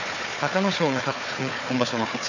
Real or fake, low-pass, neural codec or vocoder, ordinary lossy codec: fake; 7.2 kHz; codec, 16 kHz, 4 kbps, FunCodec, trained on Chinese and English, 50 frames a second; none